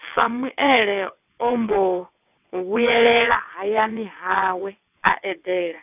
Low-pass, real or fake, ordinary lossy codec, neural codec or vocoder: 3.6 kHz; fake; none; vocoder, 22.05 kHz, 80 mel bands, WaveNeXt